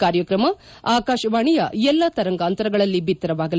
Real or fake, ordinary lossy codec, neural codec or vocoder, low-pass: real; none; none; none